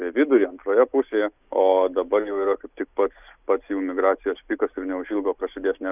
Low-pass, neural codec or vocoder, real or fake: 3.6 kHz; none; real